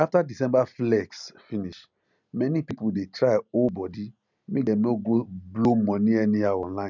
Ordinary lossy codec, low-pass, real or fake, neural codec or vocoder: none; 7.2 kHz; real; none